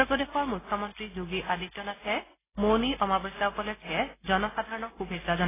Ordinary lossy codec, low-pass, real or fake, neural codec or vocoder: AAC, 16 kbps; 3.6 kHz; real; none